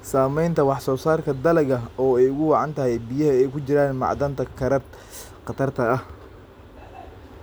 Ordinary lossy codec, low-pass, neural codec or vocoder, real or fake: none; none; none; real